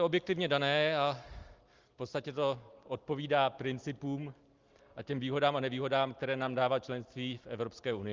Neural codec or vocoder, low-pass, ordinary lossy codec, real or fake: none; 7.2 kHz; Opus, 32 kbps; real